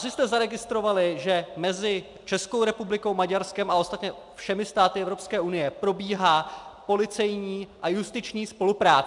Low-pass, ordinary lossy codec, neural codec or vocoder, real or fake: 10.8 kHz; MP3, 96 kbps; none; real